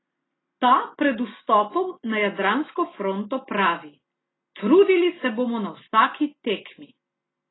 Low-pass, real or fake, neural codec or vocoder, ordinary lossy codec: 7.2 kHz; real; none; AAC, 16 kbps